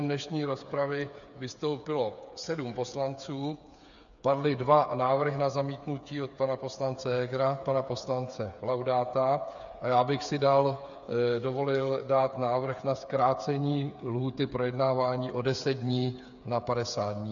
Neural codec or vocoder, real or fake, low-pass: codec, 16 kHz, 8 kbps, FreqCodec, smaller model; fake; 7.2 kHz